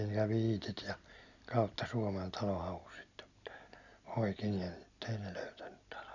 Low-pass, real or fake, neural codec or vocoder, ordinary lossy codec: 7.2 kHz; real; none; none